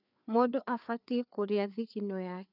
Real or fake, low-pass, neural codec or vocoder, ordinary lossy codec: fake; 5.4 kHz; codec, 16 kHz, 4 kbps, FreqCodec, larger model; none